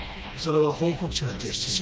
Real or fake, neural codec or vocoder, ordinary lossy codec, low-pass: fake; codec, 16 kHz, 1 kbps, FreqCodec, smaller model; none; none